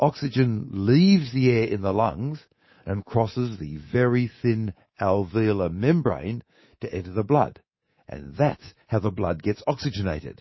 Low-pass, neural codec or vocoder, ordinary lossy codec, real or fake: 7.2 kHz; codec, 24 kHz, 3.1 kbps, DualCodec; MP3, 24 kbps; fake